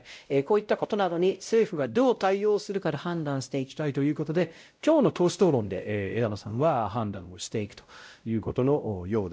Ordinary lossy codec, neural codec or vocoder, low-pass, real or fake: none; codec, 16 kHz, 0.5 kbps, X-Codec, WavLM features, trained on Multilingual LibriSpeech; none; fake